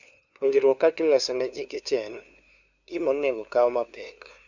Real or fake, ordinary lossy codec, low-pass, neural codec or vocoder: fake; none; 7.2 kHz; codec, 16 kHz, 2 kbps, FunCodec, trained on LibriTTS, 25 frames a second